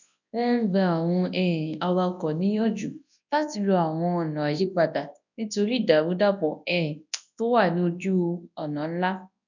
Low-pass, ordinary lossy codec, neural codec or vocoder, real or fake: 7.2 kHz; none; codec, 24 kHz, 0.9 kbps, WavTokenizer, large speech release; fake